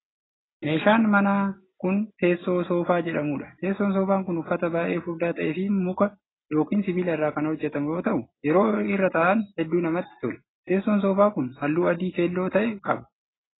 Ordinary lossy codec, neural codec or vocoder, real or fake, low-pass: AAC, 16 kbps; none; real; 7.2 kHz